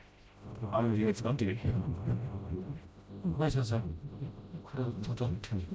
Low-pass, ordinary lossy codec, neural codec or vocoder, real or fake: none; none; codec, 16 kHz, 0.5 kbps, FreqCodec, smaller model; fake